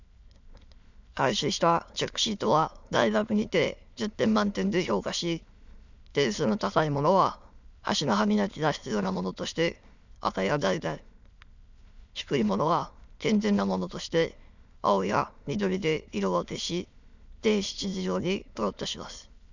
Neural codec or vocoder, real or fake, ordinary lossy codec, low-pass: autoencoder, 22.05 kHz, a latent of 192 numbers a frame, VITS, trained on many speakers; fake; none; 7.2 kHz